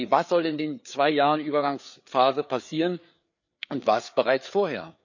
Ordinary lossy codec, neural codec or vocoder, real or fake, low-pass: none; codec, 16 kHz, 4 kbps, FreqCodec, larger model; fake; 7.2 kHz